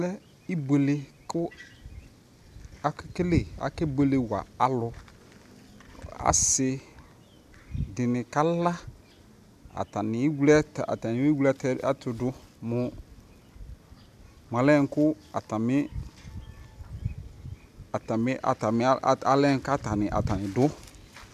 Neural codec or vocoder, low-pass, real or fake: none; 14.4 kHz; real